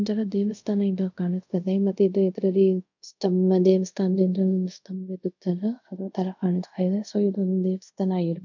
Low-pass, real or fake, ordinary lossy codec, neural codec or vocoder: 7.2 kHz; fake; none; codec, 24 kHz, 0.5 kbps, DualCodec